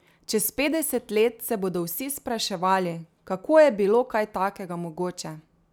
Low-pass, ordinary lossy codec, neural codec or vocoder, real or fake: none; none; none; real